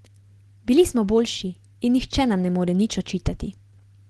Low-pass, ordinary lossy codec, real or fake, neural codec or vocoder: 10.8 kHz; Opus, 16 kbps; real; none